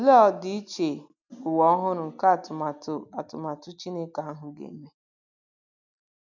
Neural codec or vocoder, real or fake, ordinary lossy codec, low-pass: none; real; none; 7.2 kHz